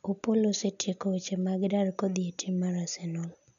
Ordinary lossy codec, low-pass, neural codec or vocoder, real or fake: none; 7.2 kHz; none; real